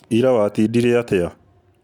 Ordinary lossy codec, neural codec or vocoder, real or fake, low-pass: none; none; real; 19.8 kHz